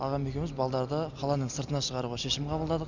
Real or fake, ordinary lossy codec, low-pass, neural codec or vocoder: real; none; 7.2 kHz; none